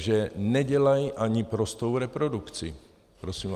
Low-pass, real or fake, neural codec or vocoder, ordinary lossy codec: 14.4 kHz; real; none; Opus, 32 kbps